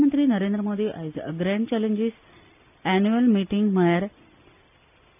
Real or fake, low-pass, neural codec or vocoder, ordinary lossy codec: real; 3.6 kHz; none; none